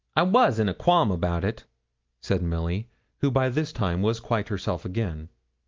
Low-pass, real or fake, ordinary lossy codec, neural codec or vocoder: 7.2 kHz; real; Opus, 24 kbps; none